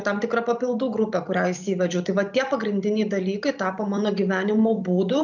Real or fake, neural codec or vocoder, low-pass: fake; vocoder, 44.1 kHz, 128 mel bands every 256 samples, BigVGAN v2; 7.2 kHz